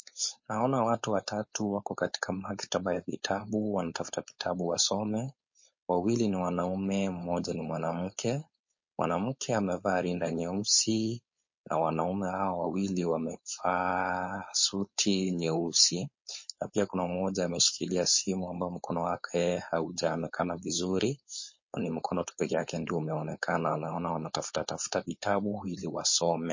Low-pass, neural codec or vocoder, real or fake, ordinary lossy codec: 7.2 kHz; codec, 16 kHz, 4.8 kbps, FACodec; fake; MP3, 32 kbps